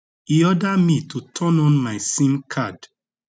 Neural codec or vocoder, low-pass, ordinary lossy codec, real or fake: none; none; none; real